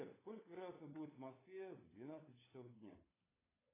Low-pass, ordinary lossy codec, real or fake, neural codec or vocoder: 3.6 kHz; AAC, 16 kbps; fake; codec, 16 kHz, 16 kbps, FunCodec, trained on LibriTTS, 50 frames a second